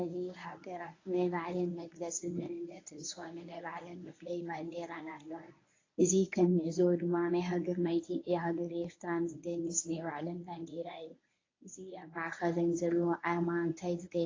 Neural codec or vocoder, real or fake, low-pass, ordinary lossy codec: codec, 24 kHz, 0.9 kbps, WavTokenizer, medium speech release version 1; fake; 7.2 kHz; AAC, 32 kbps